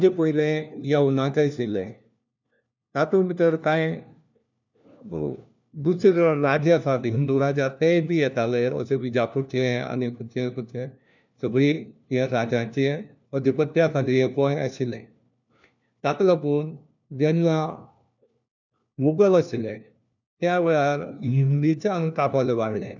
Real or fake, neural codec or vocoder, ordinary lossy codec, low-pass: fake; codec, 16 kHz, 1 kbps, FunCodec, trained on LibriTTS, 50 frames a second; none; 7.2 kHz